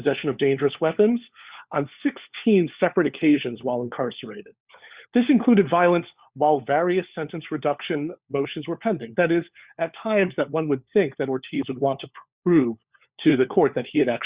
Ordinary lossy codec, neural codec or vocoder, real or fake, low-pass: Opus, 64 kbps; codec, 16 kHz, 4 kbps, FunCodec, trained on LibriTTS, 50 frames a second; fake; 3.6 kHz